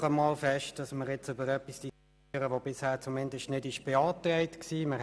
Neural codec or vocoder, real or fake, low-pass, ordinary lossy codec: none; real; none; none